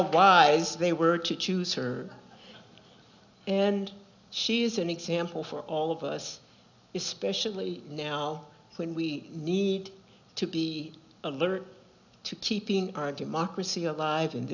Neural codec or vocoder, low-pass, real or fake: none; 7.2 kHz; real